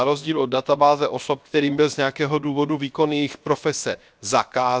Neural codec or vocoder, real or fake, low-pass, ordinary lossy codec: codec, 16 kHz, 0.7 kbps, FocalCodec; fake; none; none